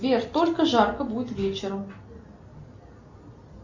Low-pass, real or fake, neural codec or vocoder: 7.2 kHz; real; none